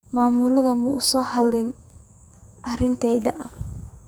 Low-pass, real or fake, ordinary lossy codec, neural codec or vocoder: none; fake; none; codec, 44.1 kHz, 2.6 kbps, SNAC